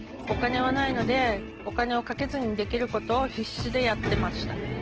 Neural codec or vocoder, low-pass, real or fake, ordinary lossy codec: none; 7.2 kHz; real; Opus, 16 kbps